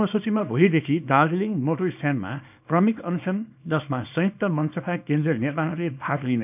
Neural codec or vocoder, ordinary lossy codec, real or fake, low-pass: codec, 24 kHz, 0.9 kbps, WavTokenizer, small release; none; fake; 3.6 kHz